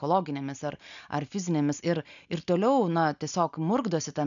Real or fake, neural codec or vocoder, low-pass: real; none; 7.2 kHz